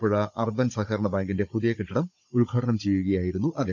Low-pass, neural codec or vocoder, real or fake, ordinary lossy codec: 7.2 kHz; codec, 16 kHz, 16 kbps, FunCodec, trained on Chinese and English, 50 frames a second; fake; none